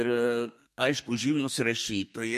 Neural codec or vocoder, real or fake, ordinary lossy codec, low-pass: codec, 32 kHz, 1.9 kbps, SNAC; fake; MP3, 64 kbps; 14.4 kHz